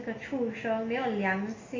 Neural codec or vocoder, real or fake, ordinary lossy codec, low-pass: none; real; AAC, 32 kbps; 7.2 kHz